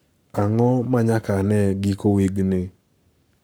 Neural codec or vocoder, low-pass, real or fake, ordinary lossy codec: codec, 44.1 kHz, 7.8 kbps, Pupu-Codec; none; fake; none